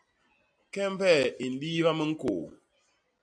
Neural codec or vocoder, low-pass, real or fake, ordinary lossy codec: none; 9.9 kHz; real; AAC, 64 kbps